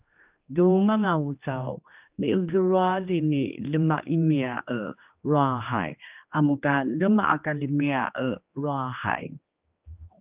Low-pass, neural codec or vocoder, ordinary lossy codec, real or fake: 3.6 kHz; codec, 16 kHz, 2 kbps, X-Codec, HuBERT features, trained on general audio; Opus, 24 kbps; fake